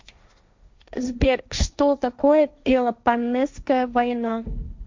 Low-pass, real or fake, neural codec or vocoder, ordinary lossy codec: 7.2 kHz; fake; codec, 16 kHz, 1.1 kbps, Voila-Tokenizer; MP3, 64 kbps